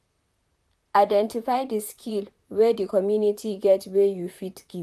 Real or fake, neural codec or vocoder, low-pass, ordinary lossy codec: fake; vocoder, 44.1 kHz, 128 mel bands, Pupu-Vocoder; 14.4 kHz; none